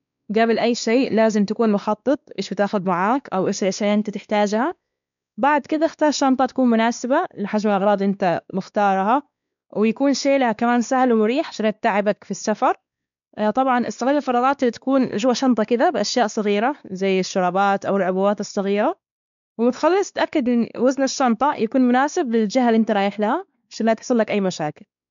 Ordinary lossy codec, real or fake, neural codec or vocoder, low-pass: none; fake; codec, 16 kHz, 2 kbps, X-Codec, WavLM features, trained on Multilingual LibriSpeech; 7.2 kHz